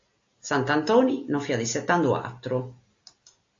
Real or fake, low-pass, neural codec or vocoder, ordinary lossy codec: real; 7.2 kHz; none; AAC, 48 kbps